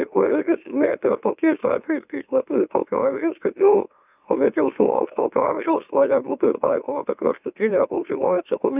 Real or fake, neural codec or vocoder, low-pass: fake; autoencoder, 44.1 kHz, a latent of 192 numbers a frame, MeloTTS; 3.6 kHz